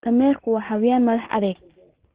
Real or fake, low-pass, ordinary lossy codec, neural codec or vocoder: real; 3.6 kHz; Opus, 16 kbps; none